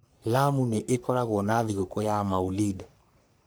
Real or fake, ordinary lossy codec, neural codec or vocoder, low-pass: fake; none; codec, 44.1 kHz, 3.4 kbps, Pupu-Codec; none